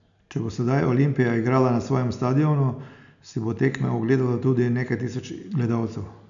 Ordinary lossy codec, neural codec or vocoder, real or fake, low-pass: none; none; real; 7.2 kHz